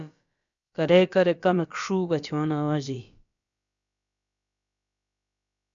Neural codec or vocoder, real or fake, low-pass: codec, 16 kHz, about 1 kbps, DyCAST, with the encoder's durations; fake; 7.2 kHz